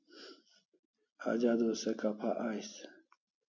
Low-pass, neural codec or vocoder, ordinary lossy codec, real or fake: 7.2 kHz; none; MP3, 48 kbps; real